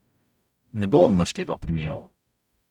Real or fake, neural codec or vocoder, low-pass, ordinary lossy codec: fake; codec, 44.1 kHz, 0.9 kbps, DAC; 19.8 kHz; none